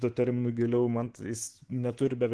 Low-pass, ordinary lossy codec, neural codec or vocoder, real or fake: 10.8 kHz; Opus, 16 kbps; codec, 24 kHz, 3.1 kbps, DualCodec; fake